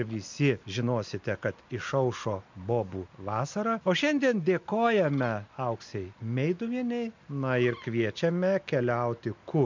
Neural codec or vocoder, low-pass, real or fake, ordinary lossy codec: none; 7.2 kHz; real; MP3, 64 kbps